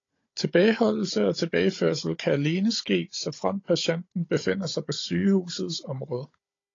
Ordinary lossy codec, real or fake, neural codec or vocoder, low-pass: AAC, 32 kbps; fake; codec, 16 kHz, 16 kbps, FunCodec, trained on Chinese and English, 50 frames a second; 7.2 kHz